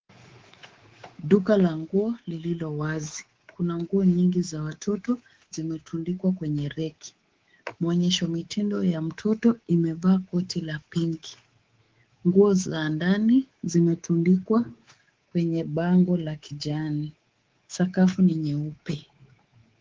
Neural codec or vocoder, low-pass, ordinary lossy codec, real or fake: codec, 24 kHz, 3.1 kbps, DualCodec; 7.2 kHz; Opus, 16 kbps; fake